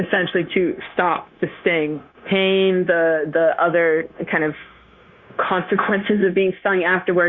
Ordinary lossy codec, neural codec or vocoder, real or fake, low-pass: Opus, 64 kbps; codec, 16 kHz, 0.9 kbps, LongCat-Audio-Codec; fake; 7.2 kHz